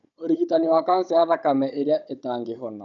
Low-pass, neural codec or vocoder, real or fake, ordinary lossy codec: 7.2 kHz; codec, 16 kHz, 16 kbps, FunCodec, trained on Chinese and English, 50 frames a second; fake; none